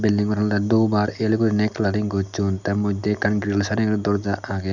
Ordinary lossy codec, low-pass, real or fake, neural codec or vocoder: none; 7.2 kHz; real; none